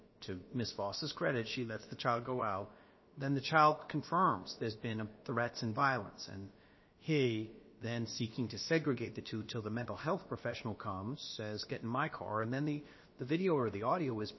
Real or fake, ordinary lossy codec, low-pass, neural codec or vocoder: fake; MP3, 24 kbps; 7.2 kHz; codec, 16 kHz, about 1 kbps, DyCAST, with the encoder's durations